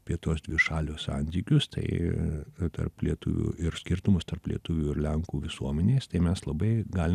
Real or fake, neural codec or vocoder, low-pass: real; none; 14.4 kHz